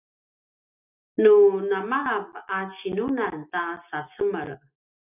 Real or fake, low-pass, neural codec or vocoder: real; 3.6 kHz; none